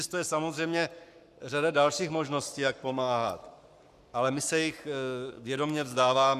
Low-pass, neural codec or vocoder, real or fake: 14.4 kHz; codec, 44.1 kHz, 7.8 kbps, Pupu-Codec; fake